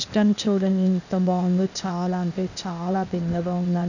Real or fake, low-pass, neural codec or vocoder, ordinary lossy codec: fake; 7.2 kHz; codec, 16 kHz, 0.8 kbps, ZipCodec; none